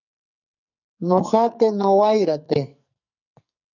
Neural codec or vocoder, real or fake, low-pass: codec, 44.1 kHz, 2.6 kbps, SNAC; fake; 7.2 kHz